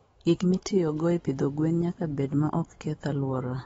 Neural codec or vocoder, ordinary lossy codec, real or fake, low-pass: autoencoder, 48 kHz, 128 numbers a frame, DAC-VAE, trained on Japanese speech; AAC, 24 kbps; fake; 19.8 kHz